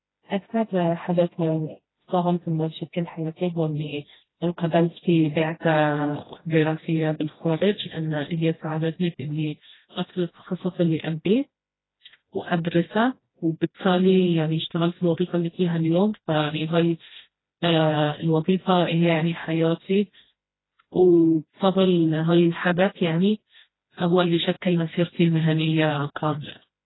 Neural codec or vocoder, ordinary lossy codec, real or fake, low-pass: codec, 16 kHz, 1 kbps, FreqCodec, smaller model; AAC, 16 kbps; fake; 7.2 kHz